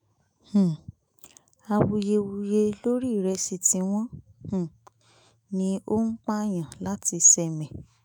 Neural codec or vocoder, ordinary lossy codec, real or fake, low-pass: autoencoder, 48 kHz, 128 numbers a frame, DAC-VAE, trained on Japanese speech; none; fake; none